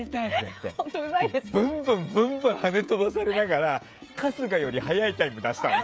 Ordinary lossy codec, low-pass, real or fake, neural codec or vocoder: none; none; fake; codec, 16 kHz, 16 kbps, FreqCodec, smaller model